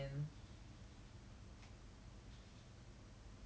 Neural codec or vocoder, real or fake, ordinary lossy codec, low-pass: none; real; none; none